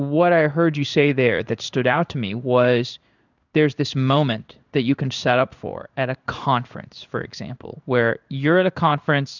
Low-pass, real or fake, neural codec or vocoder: 7.2 kHz; fake; codec, 16 kHz in and 24 kHz out, 1 kbps, XY-Tokenizer